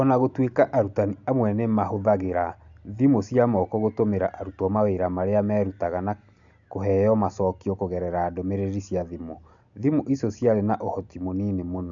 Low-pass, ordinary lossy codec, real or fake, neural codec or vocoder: 7.2 kHz; none; real; none